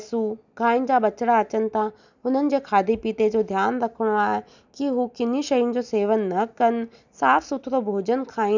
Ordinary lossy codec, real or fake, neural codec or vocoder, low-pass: none; real; none; 7.2 kHz